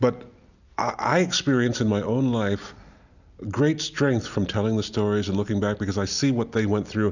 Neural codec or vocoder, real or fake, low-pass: none; real; 7.2 kHz